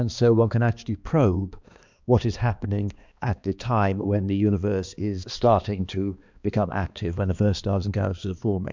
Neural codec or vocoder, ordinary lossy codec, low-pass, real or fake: codec, 16 kHz, 2 kbps, X-Codec, HuBERT features, trained on balanced general audio; MP3, 64 kbps; 7.2 kHz; fake